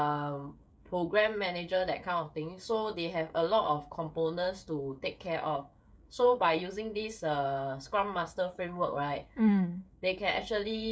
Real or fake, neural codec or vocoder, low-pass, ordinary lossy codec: fake; codec, 16 kHz, 16 kbps, FreqCodec, smaller model; none; none